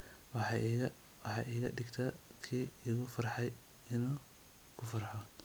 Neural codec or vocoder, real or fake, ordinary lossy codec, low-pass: vocoder, 44.1 kHz, 128 mel bands every 256 samples, BigVGAN v2; fake; none; none